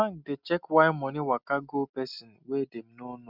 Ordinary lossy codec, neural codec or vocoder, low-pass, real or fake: none; none; 5.4 kHz; real